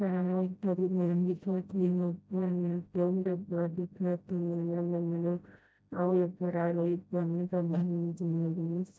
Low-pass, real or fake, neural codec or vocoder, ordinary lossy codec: none; fake; codec, 16 kHz, 0.5 kbps, FreqCodec, smaller model; none